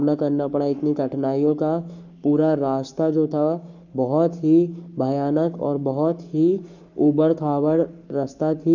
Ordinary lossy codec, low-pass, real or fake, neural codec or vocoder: none; 7.2 kHz; fake; codec, 44.1 kHz, 7.8 kbps, Pupu-Codec